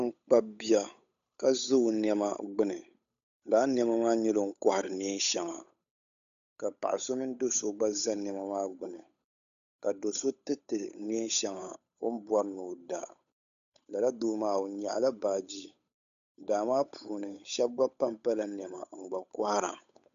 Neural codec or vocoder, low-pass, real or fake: codec, 16 kHz, 8 kbps, FunCodec, trained on Chinese and English, 25 frames a second; 7.2 kHz; fake